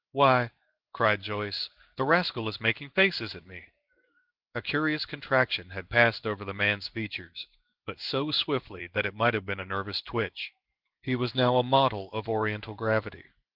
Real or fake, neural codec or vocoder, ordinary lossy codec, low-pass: fake; codec, 16 kHz in and 24 kHz out, 1 kbps, XY-Tokenizer; Opus, 32 kbps; 5.4 kHz